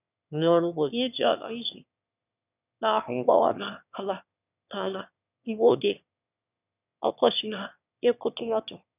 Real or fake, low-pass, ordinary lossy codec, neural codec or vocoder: fake; 3.6 kHz; none; autoencoder, 22.05 kHz, a latent of 192 numbers a frame, VITS, trained on one speaker